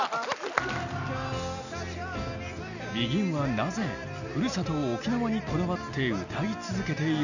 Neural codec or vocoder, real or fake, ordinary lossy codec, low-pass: none; real; none; 7.2 kHz